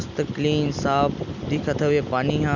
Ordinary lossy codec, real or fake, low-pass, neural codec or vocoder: none; real; 7.2 kHz; none